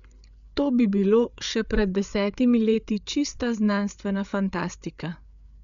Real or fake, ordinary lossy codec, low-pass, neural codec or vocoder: fake; none; 7.2 kHz; codec, 16 kHz, 8 kbps, FreqCodec, larger model